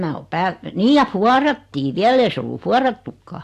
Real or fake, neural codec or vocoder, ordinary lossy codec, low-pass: real; none; AAC, 64 kbps; 14.4 kHz